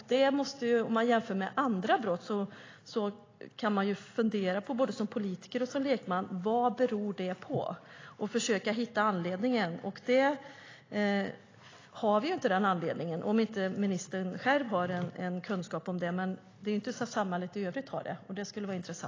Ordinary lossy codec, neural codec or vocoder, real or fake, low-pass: AAC, 32 kbps; none; real; 7.2 kHz